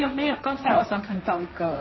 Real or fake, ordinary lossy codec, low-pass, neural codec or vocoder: fake; MP3, 24 kbps; 7.2 kHz; codec, 16 kHz, 1.1 kbps, Voila-Tokenizer